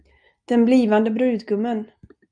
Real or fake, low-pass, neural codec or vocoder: real; 9.9 kHz; none